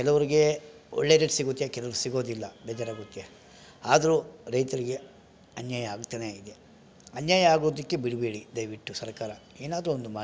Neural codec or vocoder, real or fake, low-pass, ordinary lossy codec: none; real; none; none